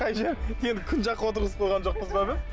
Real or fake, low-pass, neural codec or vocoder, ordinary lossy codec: real; none; none; none